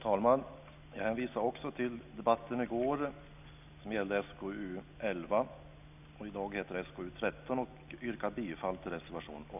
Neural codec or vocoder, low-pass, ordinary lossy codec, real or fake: none; 3.6 kHz; none; real